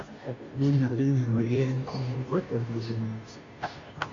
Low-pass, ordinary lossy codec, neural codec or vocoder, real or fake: 7.2 kHz; MP3, 48 kbps; codec, 16 kHz, 0.5 kbps, FunCodec, trained on Chinese and English, 25 frames a second; fake